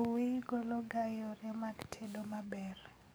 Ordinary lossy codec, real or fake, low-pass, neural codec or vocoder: none; fake; none; codec, 44.1 kHz, 7.8 kbps, DAC